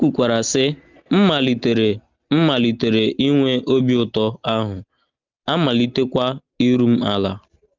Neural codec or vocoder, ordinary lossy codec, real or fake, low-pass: none; Opus, 32 kbps; real; 7.2 kHz